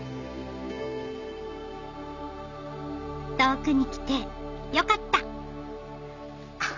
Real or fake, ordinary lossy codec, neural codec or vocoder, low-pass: real; none; none; 7.2 kHz